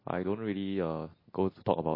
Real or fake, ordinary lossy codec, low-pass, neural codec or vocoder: real; MP3, 24 kbps; 5.4 kHz; none